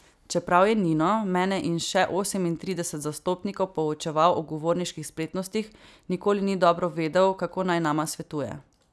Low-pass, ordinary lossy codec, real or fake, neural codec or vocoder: none; none; real; none